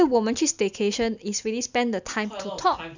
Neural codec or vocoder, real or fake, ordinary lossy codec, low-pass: none; real; none; 7.2 kHz